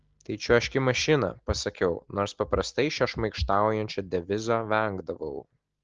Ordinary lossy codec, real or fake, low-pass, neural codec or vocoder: Opus, 16 kbps; real; 7.2 kHz; none